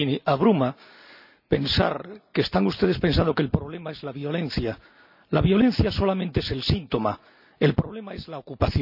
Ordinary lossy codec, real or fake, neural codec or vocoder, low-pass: none; real; none; 5.4 kHz